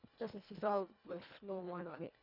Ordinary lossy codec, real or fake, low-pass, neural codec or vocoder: none; fake; 5.4 kHz; codec, 24 kHz, 1.5 kbps, HILCodec